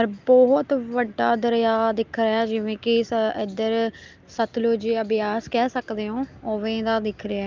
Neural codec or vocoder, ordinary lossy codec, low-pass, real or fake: none; Opus, 24 kbps; 7.2 kHz; real